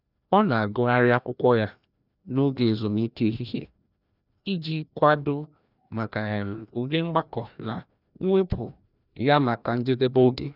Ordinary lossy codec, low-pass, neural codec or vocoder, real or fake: none; 5.4 kHz; codec, 16 kHz, 1 kbps, FreqCodec, larger model; fake